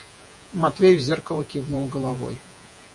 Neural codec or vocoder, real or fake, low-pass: vocoder, 48 kHz, 128 mel bands, Vocos; fake; 10.8 kHz